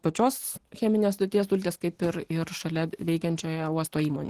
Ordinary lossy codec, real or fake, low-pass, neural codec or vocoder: Opus, 16 kbps; real; 14.4 kHz; none